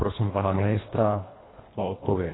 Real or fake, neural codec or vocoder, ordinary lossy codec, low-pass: fake; codec, 24 kHz, 1.5 kbps, HILCodec; AAC, 16 kbps; 7.2 kHz